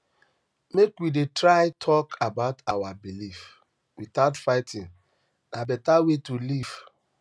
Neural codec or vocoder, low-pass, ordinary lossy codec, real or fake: none; none; none; real